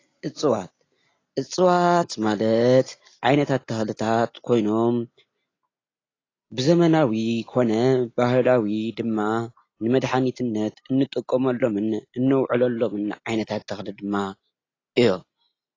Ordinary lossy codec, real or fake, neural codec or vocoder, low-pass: AAC, 32 kbps; real; none; 7.2 kHz